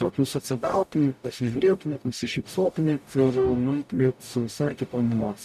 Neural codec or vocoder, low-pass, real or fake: codec, 44.1 kHz, 0.9 kbps, DAC; 14.4 kHz; fake